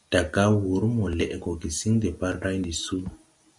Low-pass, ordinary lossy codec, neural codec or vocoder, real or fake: 10.8 kHz; Opus, 64 kbps; none; real